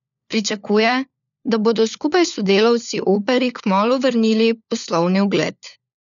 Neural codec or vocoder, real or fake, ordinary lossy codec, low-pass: codec, 16 kHz, 4 kbps, FunCodec, trained on LibriTTS, 50 frames a second; fake; none; 7.2 kHz